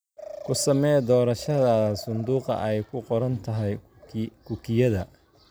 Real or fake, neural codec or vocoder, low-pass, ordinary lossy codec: real; none; none; none